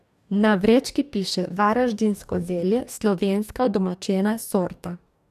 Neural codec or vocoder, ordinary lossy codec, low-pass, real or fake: codec, 44.1 kHz, 2.6 kbps, DAC; none; 14.4 kHz; fake